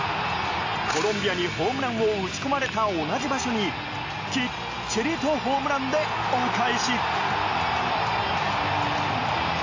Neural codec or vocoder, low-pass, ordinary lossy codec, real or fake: none; 7.2 kHz; AAC, 48 kbps; real